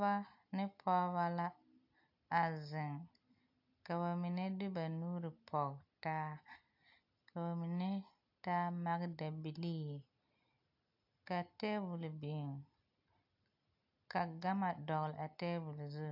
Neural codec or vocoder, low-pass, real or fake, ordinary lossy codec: none; 5.4 kHz; real; AAC, 48 kbps